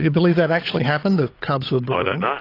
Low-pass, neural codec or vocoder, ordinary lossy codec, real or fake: 5.4 kHz; codec, 16 kHz, 8 kbps, FunCodec, trained on Chinese and English, 25 frames a second; AAC, 24 kbps; fake